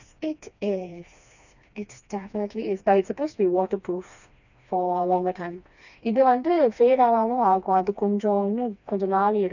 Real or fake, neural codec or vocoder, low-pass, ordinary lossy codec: fake; codec, 16 kHz, 2 kbps, FreqCodec, smaller model; 7.2 kHz; none